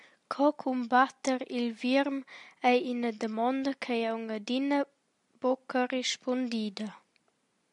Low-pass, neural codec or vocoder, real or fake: 10.8 kHz; none; real